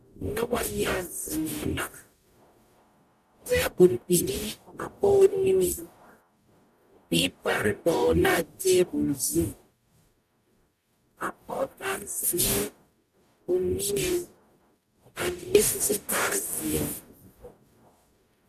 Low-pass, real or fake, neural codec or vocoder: 14.4 kHz; fake; codec, 44.1 kHz, 0.9 kbps, DAC